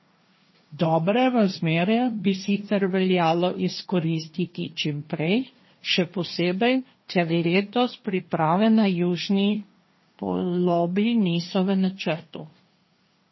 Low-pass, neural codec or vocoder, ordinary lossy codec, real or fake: 7.2 kHz; codec, 16 kHz, 1.1 kbps, Voila-Tokenizer; MP3, 24 kbps; fake